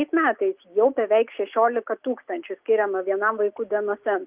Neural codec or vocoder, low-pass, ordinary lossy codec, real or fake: none; 3.6 kHz; Opus, 32 kbps; real